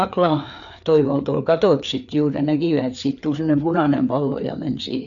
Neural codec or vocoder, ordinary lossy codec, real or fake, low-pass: codec, 16 kHz, 4 kbps, FreqCodec, larger model; none; fake; 7.2 kHz